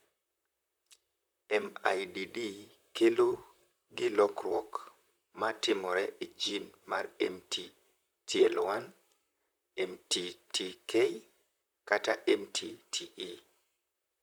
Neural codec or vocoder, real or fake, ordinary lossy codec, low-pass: vocoder, 44.1 kHz, 128 mel bands, Pupu-Vocoder; fake; none; none